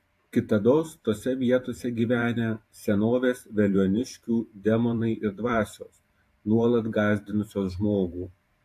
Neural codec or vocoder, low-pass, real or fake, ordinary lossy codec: vocoder, 44.1 kHz, 128 mel bands every 512 samples, BigVGAN v2; 14.4 kHz; fake; AAC, 64 kbps